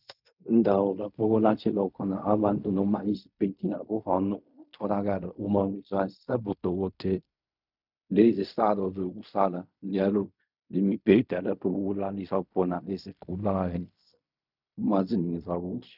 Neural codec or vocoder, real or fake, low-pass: codec, 16 kHz in and 24 kHz out, 0.4 kbps, LongCat-Audio-Codec, fine tuned four codebook decoder; fake; 5.4 kHz